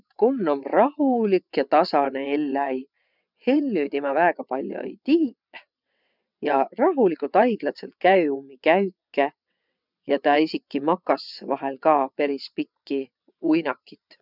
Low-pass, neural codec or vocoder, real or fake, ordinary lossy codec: 5.4 kHz; vocoder, 22.05 kHz, 80 mel bands, WaveNeXt; fake; none